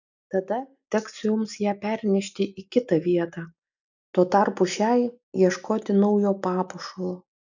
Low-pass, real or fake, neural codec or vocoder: 7.2 kHz; real; none